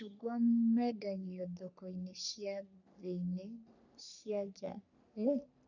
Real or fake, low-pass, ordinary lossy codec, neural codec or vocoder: fake; 7.2 kHz; none; codec, 44.1 kHz, 3.4 kbps, Pupu-Codec